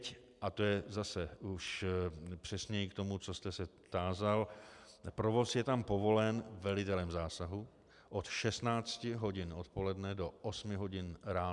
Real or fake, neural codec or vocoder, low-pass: real; none; 10.8 kHz